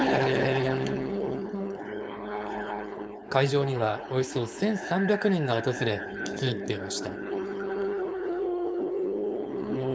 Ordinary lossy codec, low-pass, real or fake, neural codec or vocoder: none; none; fake; codec, 16 kHz, 4.8 kbps, FACodec